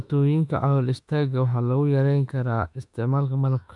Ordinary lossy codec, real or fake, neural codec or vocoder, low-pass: none; fake; autoencoder, 48 kHz, 32 numbers a frame, DAC-VAE, trained on Japanese speech; 10.8 kHz